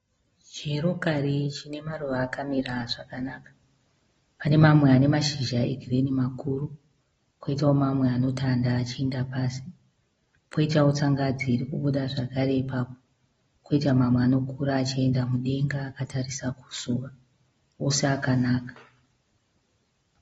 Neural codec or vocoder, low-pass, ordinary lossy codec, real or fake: none; 19.8 kHz; AAC, 24 kbps; real